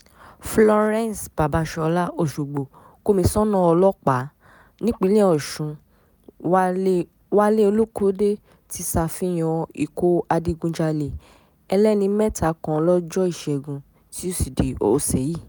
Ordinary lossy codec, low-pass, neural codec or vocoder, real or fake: none; none; none; real